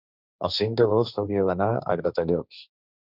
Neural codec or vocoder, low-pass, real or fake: codec, 16 kHz, 1.1 kbps, Voila-Tokenizer; 5.4 kHz; fake